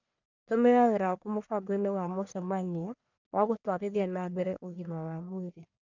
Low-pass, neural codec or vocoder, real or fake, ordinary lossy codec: 7.2 kHz; codec, 44.1 kHz, 1.7 kbps, Pupu-Codec; fake; none